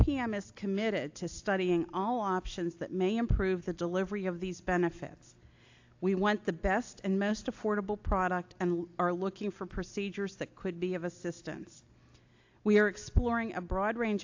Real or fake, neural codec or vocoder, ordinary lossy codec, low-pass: real; none; AAC, 48 kbps; 7.2 kHz